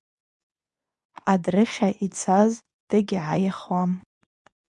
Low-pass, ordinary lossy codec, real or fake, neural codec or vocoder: 10.8 kHz; MP3, 96 kbps; fake; codec, 24 kHz, 0.9 kbps, WavTokenizer, medium speech release version 2